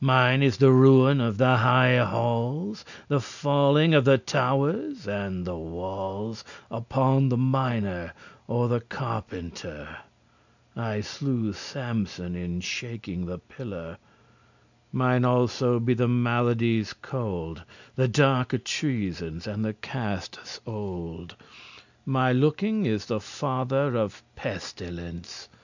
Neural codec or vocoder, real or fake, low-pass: none; real; 7.2 kHz